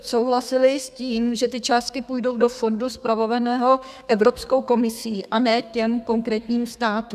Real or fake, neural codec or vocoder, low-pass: fake; codec, 32 kHz, 1.9 kbps, SNAC; 14.4 kHz